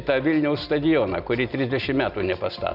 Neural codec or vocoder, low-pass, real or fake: none; 5.4 kHz; real